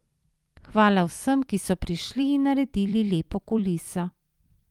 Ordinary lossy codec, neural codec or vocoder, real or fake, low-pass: Opus, 32 kbps; vocoder, 44.1 kHz, 128 mel bands every 256 samples, BigVGAN v2; fake; 19.8 kHz